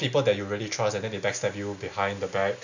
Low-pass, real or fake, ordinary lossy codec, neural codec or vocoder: 7.2 kHz; real; none; none